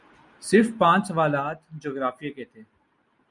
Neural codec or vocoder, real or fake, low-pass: none; real; 10.8 kHz